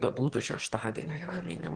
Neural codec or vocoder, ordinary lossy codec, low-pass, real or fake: autoencoder, 22.05 kHz, a latent of 192 numbers a frame, VITS, trained on one speaker; Opus, 16 kbps; 9.9 kHz; fake